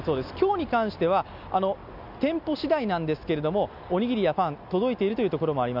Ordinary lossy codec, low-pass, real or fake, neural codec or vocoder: none; 5.4 kHz; real; none